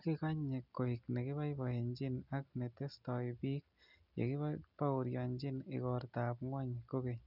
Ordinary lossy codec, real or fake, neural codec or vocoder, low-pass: none; real; none; 5.4 kHz